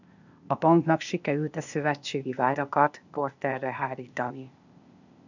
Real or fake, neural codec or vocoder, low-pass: fake; codec, 16 kHz, 0.8 kbps, ZipCodec; 7.2 kHz